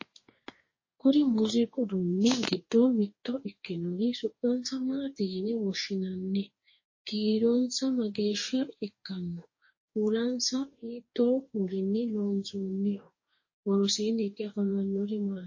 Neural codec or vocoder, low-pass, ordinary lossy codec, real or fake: codec, 44.1 kHz, 2.6 kbps, DAC; 7.2 kHz; MP3, 32 kbps; fake